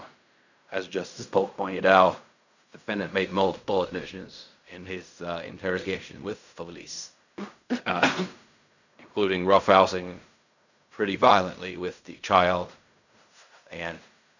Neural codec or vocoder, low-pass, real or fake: codec, 16 kHz in and 24 kHz out, 0.4 kbps, LongCat-Audio-Codec, fine tuned four codebook decoder; 7.2 kHz; fake